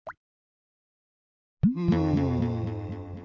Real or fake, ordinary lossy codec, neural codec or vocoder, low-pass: real; none; none; 7.2 kHz